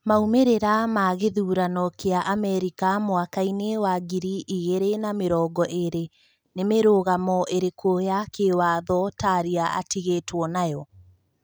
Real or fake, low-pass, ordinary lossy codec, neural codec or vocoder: real; none; none; none